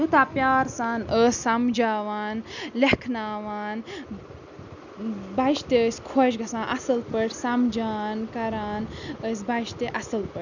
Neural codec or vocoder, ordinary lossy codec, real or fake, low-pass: none; none; real; 7.2 kHz